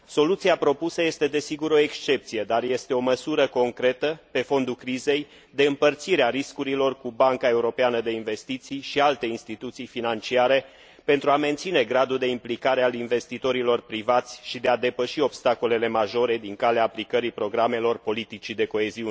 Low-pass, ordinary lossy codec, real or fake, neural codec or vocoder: none; none; real; none